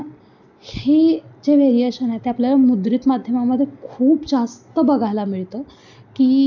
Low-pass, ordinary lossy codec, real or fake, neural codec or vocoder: 7.2 kHz; none; real; none